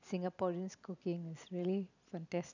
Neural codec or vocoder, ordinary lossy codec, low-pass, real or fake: none; none; 7.2 kHz; real